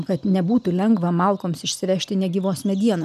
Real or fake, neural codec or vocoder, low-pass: real; none; 14.4 kHz